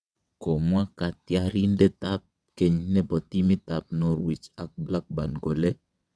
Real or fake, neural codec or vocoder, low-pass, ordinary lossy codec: fake; vocoder, 22.05 kHz, 80 mel bands, WaveNeXt; none; none